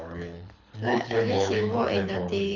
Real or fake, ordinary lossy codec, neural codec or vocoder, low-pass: fake; none; codec, 24 kHz, 6 kbps, HILCodec; 7.2 kHz